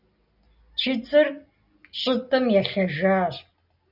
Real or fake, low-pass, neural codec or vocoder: real; 5.4 kHz; none